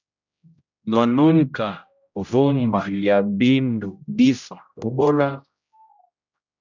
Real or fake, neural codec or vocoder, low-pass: fake; codec, 16 kHz, 0.5 kbps, X-Codec, HuBERT features, trained on general audio; 7.2 kHz